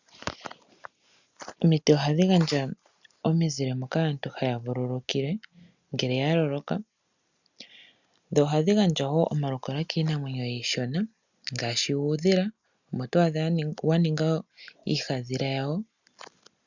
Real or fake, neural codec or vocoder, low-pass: real; none; 7.2 kHz